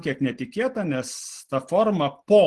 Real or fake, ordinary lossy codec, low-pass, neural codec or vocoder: real; Opus, 16 kbps; 10.8 kHz; none